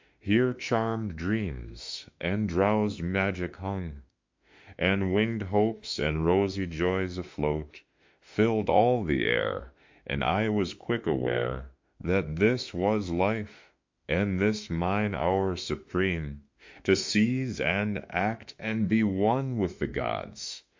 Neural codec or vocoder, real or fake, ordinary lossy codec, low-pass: autoencoder, 48 kHz, 32 numbers a frame, DAC-VAE, trained on Japanese speech; fake; MP3, 48 kbps; 7.2 kHz